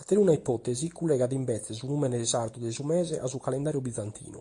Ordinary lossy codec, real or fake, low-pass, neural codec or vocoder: AAC, 48 kbps; real; 10.8 kHz; none